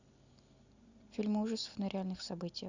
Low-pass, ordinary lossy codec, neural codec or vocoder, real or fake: 7.2 kHz; Opus, 64 kbps; none; real